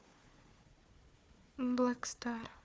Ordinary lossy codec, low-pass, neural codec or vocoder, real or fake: none; none; codec, 16 kHz, 4 kbps, FunCodec, trained on Chinese and English, 50 frames a second; fake